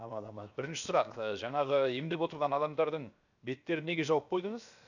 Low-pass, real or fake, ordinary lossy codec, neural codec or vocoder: 7.2 kHz; fake; none; codec, 16 kHz, 0.7 kbps, FocalCodec